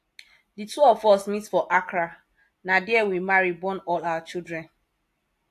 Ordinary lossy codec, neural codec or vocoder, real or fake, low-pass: AAC, 64 kbps; none; real; 14.4 kHz